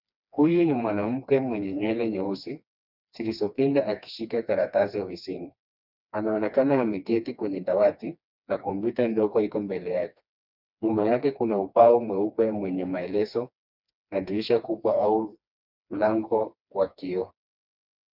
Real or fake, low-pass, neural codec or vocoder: fake; 5.4 kHz; codec, 16 kHz, 2 kbps, FreqCodec, smaller model